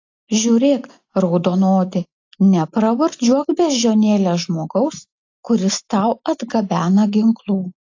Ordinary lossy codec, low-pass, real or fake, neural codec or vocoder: AAC, 48 kbps; 7.2 kHz; real; none